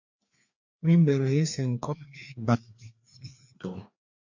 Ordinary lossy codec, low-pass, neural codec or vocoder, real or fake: MP3, 48 kbps; 7.2 kHz; codec, 16 kHz, 2 kbps, FreqCodec, larger model; fake